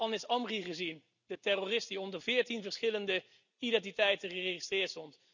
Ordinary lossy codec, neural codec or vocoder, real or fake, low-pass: none; none; real; 7.2 kHz